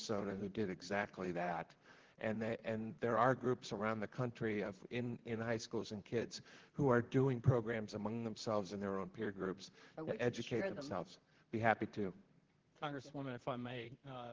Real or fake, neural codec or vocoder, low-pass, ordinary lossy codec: fake; vocoder, 44.1 kHz, 128 mel bands, Pupu-Vocoder; 7.2 kHz; Opus, 16 kbps